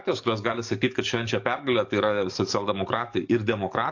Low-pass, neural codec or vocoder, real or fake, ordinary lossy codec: 7.2 kHz; codec, 44.1 kHz, 7.8 kbps, DAC; fake; AAC, 48 kbps